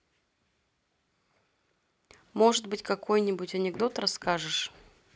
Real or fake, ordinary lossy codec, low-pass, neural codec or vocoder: real; none; none; none